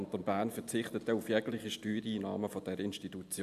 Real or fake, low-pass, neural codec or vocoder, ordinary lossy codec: real; 14.4 kHz; none; AAC, 96 kbps